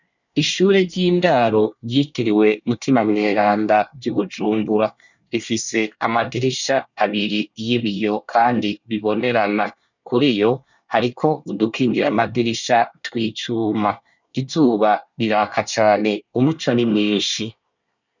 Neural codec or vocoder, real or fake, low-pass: codec, 24 kHz, 1 kbps, SNAC; fake; 7.2 kHz